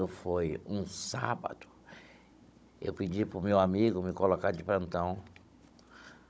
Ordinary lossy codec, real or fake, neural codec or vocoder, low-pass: none; fake; codec, 16 kHz, 16 kbps, FunCodec, trained on Chinese and English, 50 frames a second; none